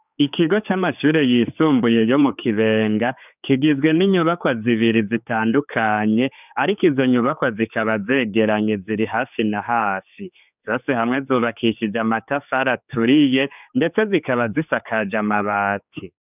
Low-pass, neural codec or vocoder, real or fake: 3.6 kHz; codec, 16 kHz, 4 kbps, X-Codec, HuBERT features, trained on general audio; fake